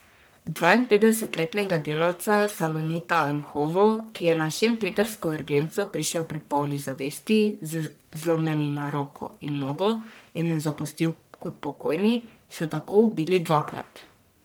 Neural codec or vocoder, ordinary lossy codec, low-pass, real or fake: codec, 44.1 kHz, 1.7 kbps, Pupu-Codec; none; none; fake